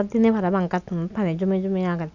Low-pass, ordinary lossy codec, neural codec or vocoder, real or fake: 7.2 kHz; none; none; real